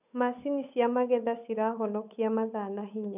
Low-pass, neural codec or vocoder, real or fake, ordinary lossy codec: 3.6 kHz; codec, 16 kHz, 8 kbps, FunCodec, trained on Chinese and English, 25 frames a second; fake; none